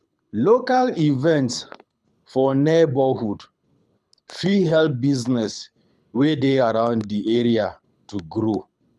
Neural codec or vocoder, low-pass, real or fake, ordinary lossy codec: codec, 24 kHz, 6 kbps, HILCodec; none; fake; none